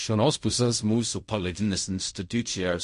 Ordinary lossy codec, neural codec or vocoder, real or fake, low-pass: MP3, 48 kbps; codec, 16 kHz in and 24 kHz out, 0.4 kbps, LongCat-Audio-Codec, fine tuned four codebook decoder; fake; 10.8 kHz